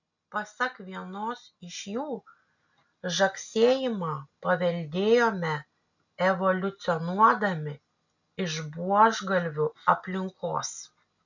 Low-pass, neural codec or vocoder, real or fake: 7.2 kHz; none; real